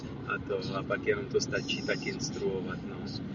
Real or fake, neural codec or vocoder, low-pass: real; none; 7.2 kHz